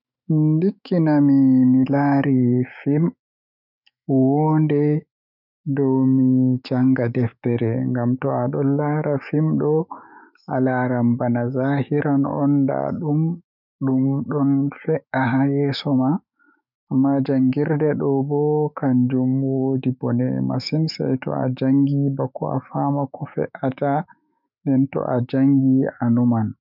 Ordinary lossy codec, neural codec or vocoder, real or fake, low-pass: none; autoencoder, 48 kHz, 128 numbers a frame, DAC-VAE, trained on Japanese speech; fake; 5.4 kHz